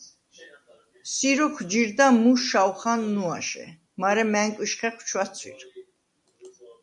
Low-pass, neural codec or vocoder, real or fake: 10.8 kHz; none; real